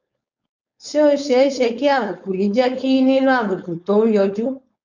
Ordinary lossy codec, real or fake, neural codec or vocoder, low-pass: MP3, 64 kbps; fake; codec, 16 kHz, 4.8 kbps, FACodec; 7.2 kHz